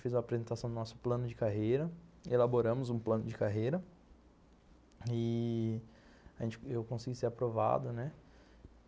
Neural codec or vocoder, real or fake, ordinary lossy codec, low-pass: none; real; none; none